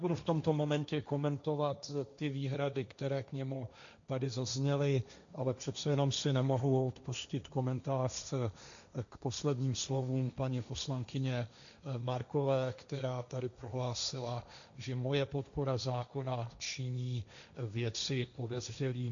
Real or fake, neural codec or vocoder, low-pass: fake; codec, 16 kHz, 1.1 kbps, Voila-Tokenizer; 7.2 kHz